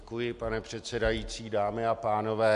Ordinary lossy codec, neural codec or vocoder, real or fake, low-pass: MP3, 64 kbps; none; real; 10.8 kHz